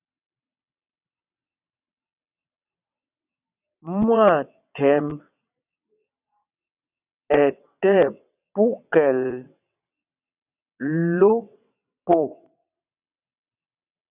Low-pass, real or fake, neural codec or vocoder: 3.6 kHz; fake; vocoder, 22.05 kHz, 80 mel bands, WaveNeXt